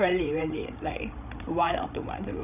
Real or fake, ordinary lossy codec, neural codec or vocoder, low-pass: fake; none; codec, 16 kHz, 16 kbps, FreqCodec, larger model; 3.6 kHz